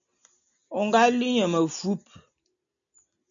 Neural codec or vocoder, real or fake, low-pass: none; real; 7.2 kHz